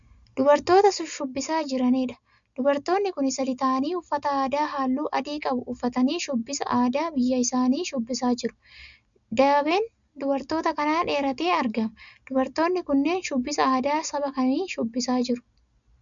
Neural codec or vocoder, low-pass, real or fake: none; 7.2 kHz; real